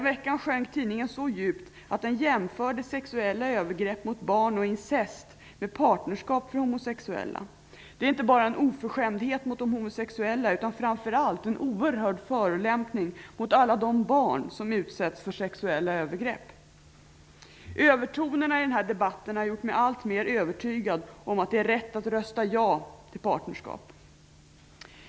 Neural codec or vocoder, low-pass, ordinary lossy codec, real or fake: none; none; none; real